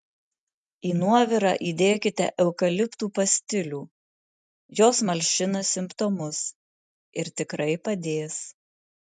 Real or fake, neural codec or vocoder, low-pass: real; none; 10.8 kHz